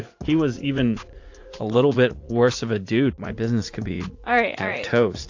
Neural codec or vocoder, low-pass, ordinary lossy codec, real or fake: vocoder, 44.1 kHz, 80 mel bands, Vocos; 7.2 kHz; AAC, 48 kbps; fake